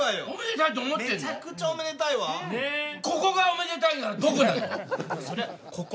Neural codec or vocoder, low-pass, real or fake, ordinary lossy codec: none; none; real; none